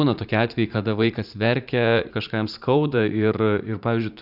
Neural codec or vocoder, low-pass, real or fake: vocoder, 44.1 kHz, 80 mel bands, Vocos; 5.4 kHz; fake